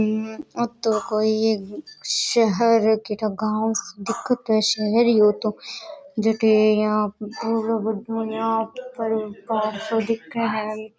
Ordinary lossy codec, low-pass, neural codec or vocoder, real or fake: none; none; none; real